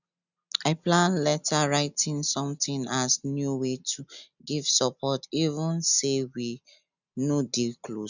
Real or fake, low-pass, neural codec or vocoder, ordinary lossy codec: real; 7.2 kHz; none; none